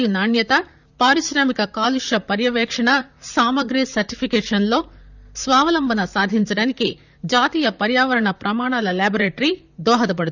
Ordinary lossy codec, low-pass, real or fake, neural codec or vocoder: none; 7.2 kHz; fake; vocoder, 44.1 kHz, 128 mel bands, Pupu-Vocoder